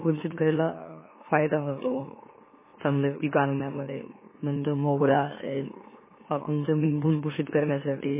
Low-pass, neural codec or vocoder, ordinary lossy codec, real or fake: 3.6 kHz; autoencoder, 44.1 kHz, a latent of 192 numbers a frame, MeloTTS; MP3, 16 kbps; fake